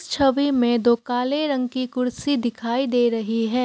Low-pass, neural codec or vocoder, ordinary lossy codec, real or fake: none; none; none; real